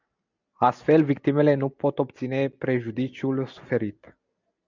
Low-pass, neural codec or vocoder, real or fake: 7.2 kHz; none; real